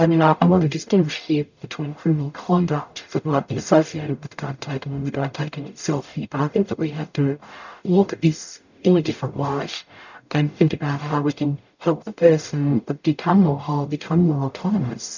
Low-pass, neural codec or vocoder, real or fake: 7.2 kHz; codec, 44.1 kHz, 0.9 kbps, DAC; fake